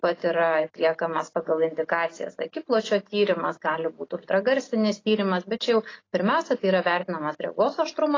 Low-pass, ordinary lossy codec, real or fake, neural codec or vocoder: 7.2 kHz; AAC, 32 kbps; real; none